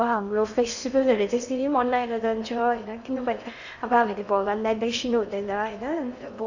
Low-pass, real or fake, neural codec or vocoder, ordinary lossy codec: 7.2 kHz; fake; codec, 16 kHz in and 24 kHz out, 0.8 kbps, FocalCodec, streaming, 65536 codes; none